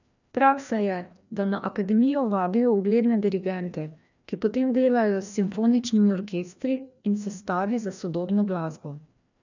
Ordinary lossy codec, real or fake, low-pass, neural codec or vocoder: none; fake; 7.2 kHz; codec, 16 kHz, 1 kbps, FreqCodec, larger model